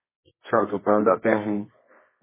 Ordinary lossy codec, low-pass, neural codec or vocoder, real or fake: MP3, 16 kbps; 3.6 kHz; codec, 24 kHz, 0.9 kbps, WavTokenizer, medium music audio release; fake